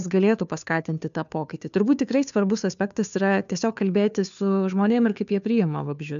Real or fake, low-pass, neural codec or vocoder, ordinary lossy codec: fake; 7.2 kHz; codec, 16 kHz, 2 kbps, FunCodec, trained on Chinese and English, 25 frames a second; MP3, 96 kbps